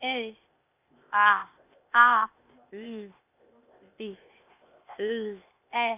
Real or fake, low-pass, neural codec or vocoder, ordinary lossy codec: fake; 3.6 kHz; codec, 16 kHz, 0.8 kbps, ZipCodec; none